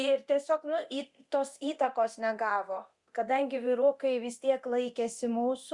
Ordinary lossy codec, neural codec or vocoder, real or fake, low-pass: Opus, 64 kbps; codec, 24 kHz, 0.9 kbps, DualCodec; fake; 10.8 kHz